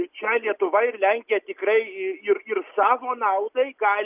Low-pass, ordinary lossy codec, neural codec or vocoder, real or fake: 3.6 kHz; Opus, 64 kbps; none; real